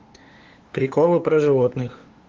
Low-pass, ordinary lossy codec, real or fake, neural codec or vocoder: 7.2 kHz; Opus, 16 kbps; fake; codec, 16 kHz, 2 kbps, FunCodec, trained on LibriTTS, 25 frames a second